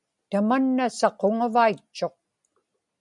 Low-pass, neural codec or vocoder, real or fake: 10.8 kHz; none; real